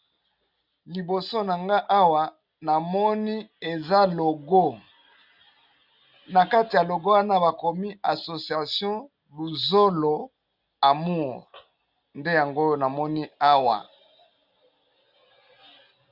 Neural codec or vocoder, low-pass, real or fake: none; 5.4 kHz; real